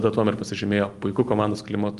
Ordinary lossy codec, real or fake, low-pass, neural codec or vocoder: Opus, 24 kbps; real; 10.8 kHz; none